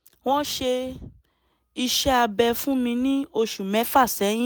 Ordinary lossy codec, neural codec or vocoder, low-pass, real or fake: none; none; none; real